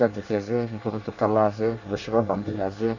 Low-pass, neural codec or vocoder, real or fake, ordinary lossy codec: 7.2 kHz; codec, 24 kHz, 1 kbps, SNAC; fake; none